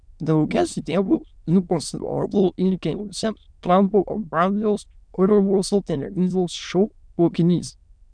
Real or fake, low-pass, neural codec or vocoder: fake; 9.9 kHz; autoencoder, 22.05 kHz, a latent of 192 numbers a frame, VITS, trained on many speakers